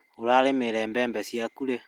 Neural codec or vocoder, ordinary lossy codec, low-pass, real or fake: none; Opus, 24 kbps; 19.8 kHz; real